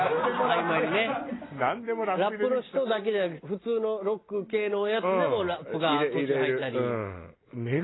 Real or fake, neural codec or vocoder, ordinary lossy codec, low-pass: real; none; AAC, 16 kbps; 7.2 kHz